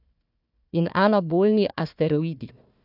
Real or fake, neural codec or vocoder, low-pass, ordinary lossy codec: fake; codec, 16 kHz, 1 kbps, FunCodec, trained on Chinese and English, 50 frames a second; 5.4 kHz; none